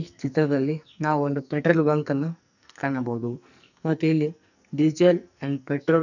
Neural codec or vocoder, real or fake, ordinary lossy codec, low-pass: codec, 44.1 kHz, 2.6 kbps, SNAC; fake; none; 7.2 kHz